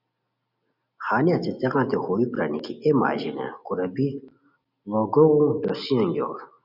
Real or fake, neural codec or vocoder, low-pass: real; none; 5.4 kHz